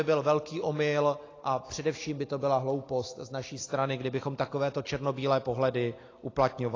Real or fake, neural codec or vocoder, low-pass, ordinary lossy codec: real; none; 7.2 kHz; AAC, 32 kbps